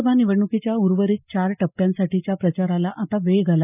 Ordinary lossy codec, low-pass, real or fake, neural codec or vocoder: none; 3.6 kHz; real; none